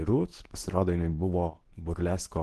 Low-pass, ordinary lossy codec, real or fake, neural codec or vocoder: 10.8 kHz; Opus, 16 kbps; fake; codec, 24 kHz, 0.9 kbps, WavTokenizer, medium speech release version 2